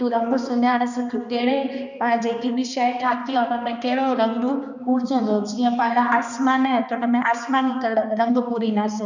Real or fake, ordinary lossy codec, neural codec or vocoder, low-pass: fake; none; codec, 16 kHz, 2 kbps, X-Codec, HuBERT features, trained on balanced general audio; 7.2 kHz